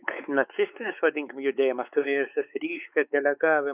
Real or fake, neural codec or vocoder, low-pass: fake; codec, 16 kHz, 4 kbps, X-Codec, WavLM features, trained on Multilingual LibriSpeech; 3.6 kHz